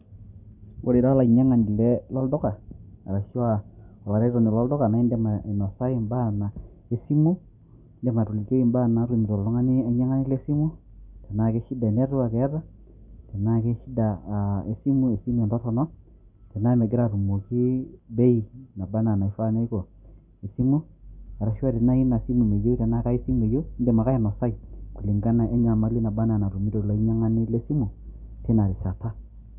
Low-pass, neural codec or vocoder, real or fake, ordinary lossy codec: 3.6 kHz; none; real; none